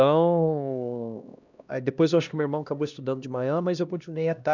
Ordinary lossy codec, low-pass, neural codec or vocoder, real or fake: none; 7.2 kHz; codec, 16 kHz, 1 kbps, X-Codec, HuBERT features, trained on LibriSpeech; fake